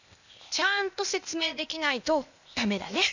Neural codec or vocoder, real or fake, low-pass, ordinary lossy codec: codec, 16 kHz, 0.8 kbps, ZipCodec; fake; 7.2 kHz; none